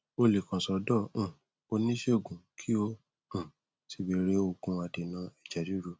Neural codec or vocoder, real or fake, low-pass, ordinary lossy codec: none; real; none; none